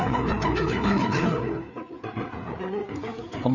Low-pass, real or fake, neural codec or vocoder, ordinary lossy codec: 7.2 kHz; fake; codec, 16 kHz, 4 kbps, FreqCodec, larger model; none